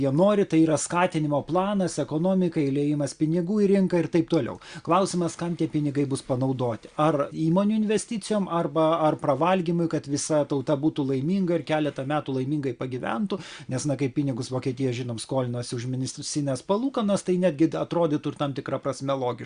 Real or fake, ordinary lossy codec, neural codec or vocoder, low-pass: real; Opus, 64 kbps; none; 9.9 kHz